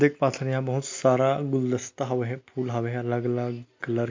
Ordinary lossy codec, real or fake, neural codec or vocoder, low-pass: MP3, 48 kbps; real; none; 7.2 kHz